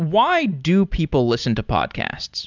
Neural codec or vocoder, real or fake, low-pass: none; real; 7.2 kHz